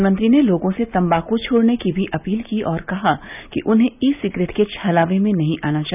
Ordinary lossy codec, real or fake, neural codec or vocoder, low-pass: none; real; none; 3.6 kHz